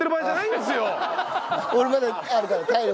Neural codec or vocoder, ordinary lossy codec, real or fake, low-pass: none; none; real; none